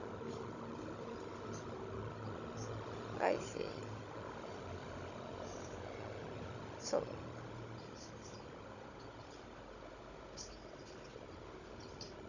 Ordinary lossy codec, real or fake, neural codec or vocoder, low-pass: none; fake; codec, 16 kHz, 16 kbps, FunCodec, trained on Chinese and English, 50 frames a second; 7.2 kHz